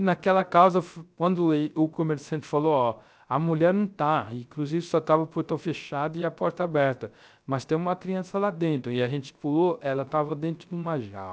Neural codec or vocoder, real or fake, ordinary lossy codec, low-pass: codec, 16 kHz, 0.3 kbps, FocalCodec; fake; none; none